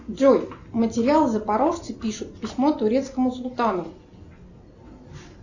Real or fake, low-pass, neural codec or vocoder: real; 7.2 kHz; none